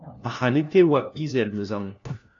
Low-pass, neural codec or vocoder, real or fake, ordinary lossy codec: 7.2 kHz; codec, 16 kHz, 1 kbps, FunCodec, trained on LibriTTS, 50 frames a second; fake; AAC, 48 kbps